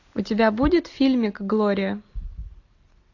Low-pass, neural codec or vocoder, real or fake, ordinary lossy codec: 7.2 kHz; none; real; AAC, 48 kbps